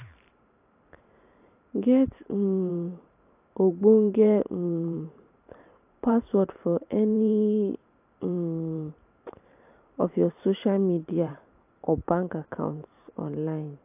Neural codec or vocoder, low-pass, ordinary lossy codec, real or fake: vocoder, 44.1 kHz, 128 mel bands every 512 samples, BigVGAN v2; 3.6 kHz; AAC, 32 kbps; fake